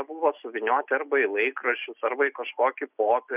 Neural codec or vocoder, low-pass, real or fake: none; 3.6 kHz; real